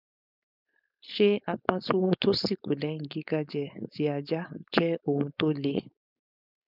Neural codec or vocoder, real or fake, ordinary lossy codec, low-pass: codec, 16 kHz, 4.8 kbps, FACodec; fake; none; 5.4 kHz